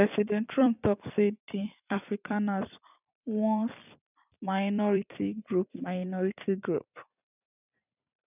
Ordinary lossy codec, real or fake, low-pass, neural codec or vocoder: none; fake; 3.6 kHz; vocoder, 44.1 kHz, 128 mel bands every 256 samples, BigVGAN v2